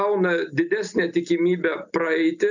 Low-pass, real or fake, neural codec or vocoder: 7.2 kHz; real; none